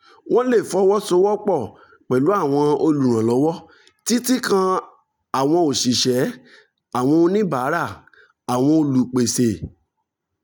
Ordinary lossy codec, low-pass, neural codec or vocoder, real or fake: none; none; none; real